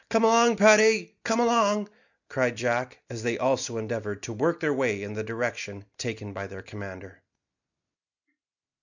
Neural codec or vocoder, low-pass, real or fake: none; 7.2 kHz; real